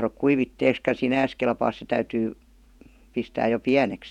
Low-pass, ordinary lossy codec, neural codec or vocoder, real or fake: 19.8 kHz; none; vocoder, 48 kHz, 128 mel bands, Vocos; fake